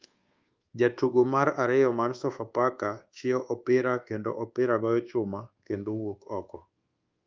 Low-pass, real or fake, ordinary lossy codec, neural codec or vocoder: 7.2 kHz; fake; Opus, 32 kbps; codec, 24 kHz, 1.2 kbps, DualCodec